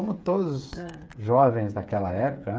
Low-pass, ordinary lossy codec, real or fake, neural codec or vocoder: none; none; fake; codec, 16 kHz, 8 kbps, FreqCodec, smaller model